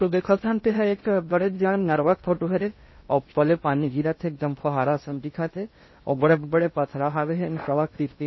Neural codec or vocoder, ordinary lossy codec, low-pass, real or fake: codec, 16 kHz in and 24 kHz out, 0.6 kbps, FocalCodec, streaming, 2048 codes; MP3, 24 kbps; 7.2 kHz; fake